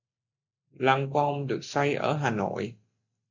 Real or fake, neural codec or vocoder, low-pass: real; none; 7.2 kHz